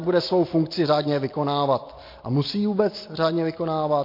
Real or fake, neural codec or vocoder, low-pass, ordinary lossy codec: real; none; 5.4 kHz; MP3, 32 kbps